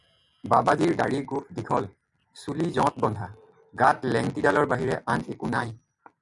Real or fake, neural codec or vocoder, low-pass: real; none; 10.8 kHz